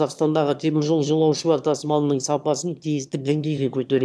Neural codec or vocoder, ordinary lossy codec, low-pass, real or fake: autoencoder, 22.05 kHz, a latent of 192 numbers a frame, VITS, trained on one speaker; none; none; fake